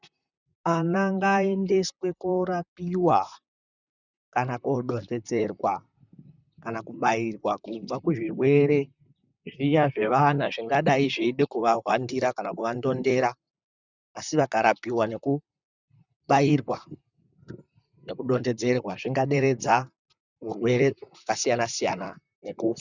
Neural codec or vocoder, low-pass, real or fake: vocoder, 44.1 kHz, 128 mel bands, Pupu-Vocoder; 7.2 kHz; fake